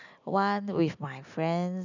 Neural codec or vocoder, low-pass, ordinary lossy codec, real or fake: none; 7.2 kHz; none; real